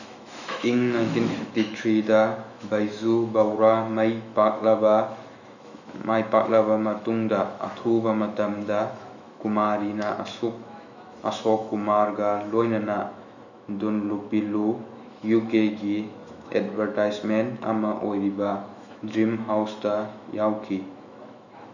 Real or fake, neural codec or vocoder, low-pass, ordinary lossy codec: fake; autoencoder, 48 kHz, 128 numbers a frame, DAC-VAE, trained on Japanese speech; 7.2 kHz; none